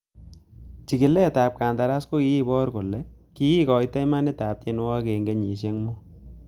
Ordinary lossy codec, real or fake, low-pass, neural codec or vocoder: Opus, 32 kbps; real; 19.8 kHz; none